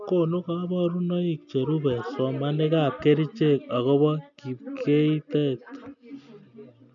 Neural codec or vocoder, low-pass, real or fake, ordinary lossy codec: none; 7.2 kHz; real; none